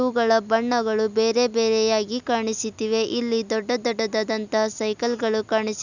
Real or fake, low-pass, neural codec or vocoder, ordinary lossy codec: real; 7.2 kHz; none; none